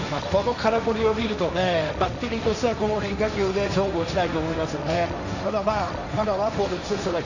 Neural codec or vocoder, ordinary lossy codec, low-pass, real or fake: codec, 16 kHz, 1.1 kbps, Voila-Tokenizer; none; 7.2 kHz; fake